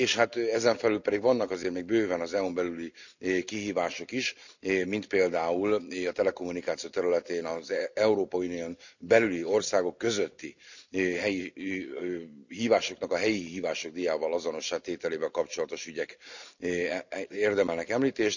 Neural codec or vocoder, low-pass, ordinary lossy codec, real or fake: none; 7.2 kHz; none; real